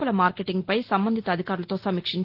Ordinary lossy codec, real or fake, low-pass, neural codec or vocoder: Opus, 16 kbps; real; 5.4 kHz; none